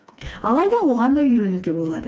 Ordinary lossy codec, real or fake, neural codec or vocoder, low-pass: none; fake; codec, 16 kHz, 2 kbps, FreqCodec, smaller model; none